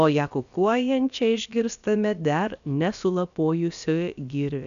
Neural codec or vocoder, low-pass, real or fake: codec, 16 kHz, about 1 kbps, DyCAST, with the encoder's durations; 7.2 kHz; fake